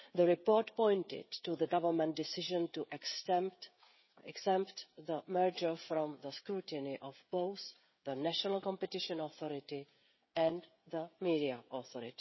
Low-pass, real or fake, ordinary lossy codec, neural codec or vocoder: 7.2 kHz; fake; MP3, 24 kbps; codec, 16 kHz, 16 kbps, FreqCodec, smaller model